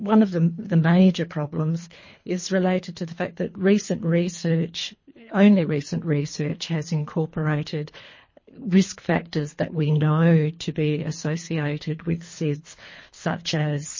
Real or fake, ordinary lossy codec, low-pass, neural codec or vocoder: fake; MP3, 32 kbps; 7.2 kHz; codec, 24 kHz, 3 kbps, HILCodec